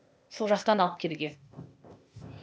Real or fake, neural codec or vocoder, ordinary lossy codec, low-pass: fake; codec, 16 kHz, 0.8 kbps, ZipCodec; none; none